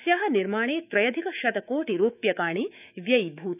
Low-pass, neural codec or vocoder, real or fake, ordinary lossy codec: 3.6 kHz; autoencoder, 48 kHz, 128 numbers a frame, DAC-VAE, trained on Japanese speech; fake; none